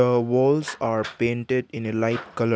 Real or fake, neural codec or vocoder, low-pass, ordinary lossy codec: real; none; none; none